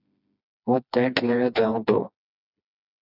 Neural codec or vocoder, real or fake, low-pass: codec, 16 kHz, 2 kbps, FreqCodec, smaller model; fake; 5.4 kHz